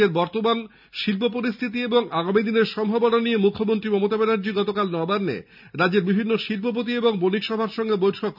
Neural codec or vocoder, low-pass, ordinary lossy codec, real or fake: none; 5.4 kHz; none; real